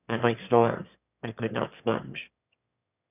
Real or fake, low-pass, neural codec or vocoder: fake; 3.6 kHz; autoencoder, 22.05 kHz, a latent of 192 numbers a frame, VITS, trained on one speaker